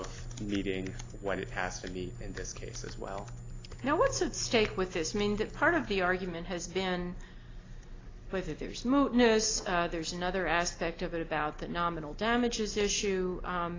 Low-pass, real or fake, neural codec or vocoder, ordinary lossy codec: 7.2 kHz; real; none; AAC, 32 kbps